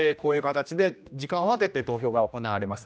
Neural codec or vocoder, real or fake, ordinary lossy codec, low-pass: codec, 16 kHz, 1 kbps, X-Codec, HuBERT features, trained on general audio; fake; none; none